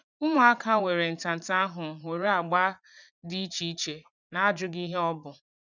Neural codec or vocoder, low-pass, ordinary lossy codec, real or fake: vocoder, 44.1 kHz, 80 mel bands, Vocos; 7.2 kHz; none; fake